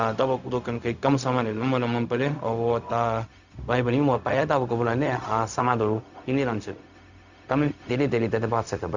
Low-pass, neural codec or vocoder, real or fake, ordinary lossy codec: 7.2 kHz; codec, 16 kHz, 0.4 kbps, LongCat-Audio-Codec; fake; Opus, 64 kbps